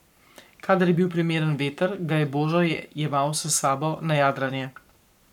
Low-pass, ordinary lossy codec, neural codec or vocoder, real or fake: 19.8 kHz; none; codec, 44.1 kHz, 7.8 kbps, Pupu-Codec; fake